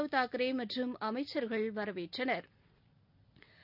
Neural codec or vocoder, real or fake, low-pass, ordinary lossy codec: none; real; 5.4 kHz; none